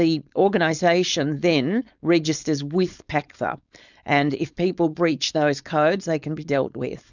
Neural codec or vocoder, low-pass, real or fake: codec, 16 kHz, 4.8 kbps, FACodec; 7.2 kHz; fake